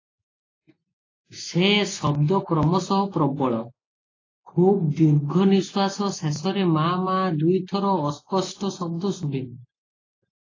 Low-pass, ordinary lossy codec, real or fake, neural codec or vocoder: 7.2 kHz; AAC, 32 kbps; real; none